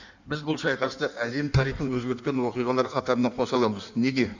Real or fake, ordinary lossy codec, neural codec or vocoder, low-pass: fake; none; codec, 16 kHz in and 24 kHz out, 1.1 kbps, FireRedTTS-2 codec; 7.2 kHz